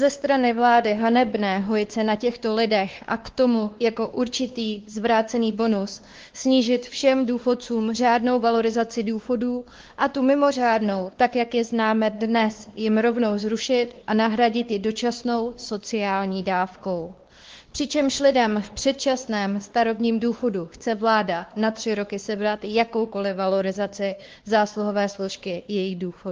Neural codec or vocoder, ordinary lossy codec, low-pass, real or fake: codec, 16 kHz, 2 kbps, X-Codec, WavLM features, trained on Multilingual LibriSpeech; Opus, 16 kbps; 7.2 kHz; fake